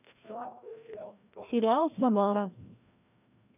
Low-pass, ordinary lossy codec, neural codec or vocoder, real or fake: 3.6 kHz; none; codec, 16 kHz, 1 kbps, FreqCodec, larger model; fake